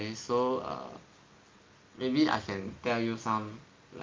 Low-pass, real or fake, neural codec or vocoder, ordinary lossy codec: 7.2 kHz; real; none; Opus, 32 kbps